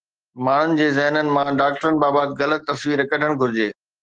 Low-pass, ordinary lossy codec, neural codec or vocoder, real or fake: 9.9 kHz; Opus, 16 kbps; autoencoder, 48 kHz, 128 numbers a frame, DAC-VAE, trained on Japanese speech; fake